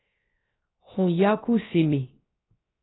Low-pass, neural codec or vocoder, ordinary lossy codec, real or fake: 7.2 kHz; codec, 16 kHz, 0.3 kbps, FocalCodec; AAC, 16 kbps; fake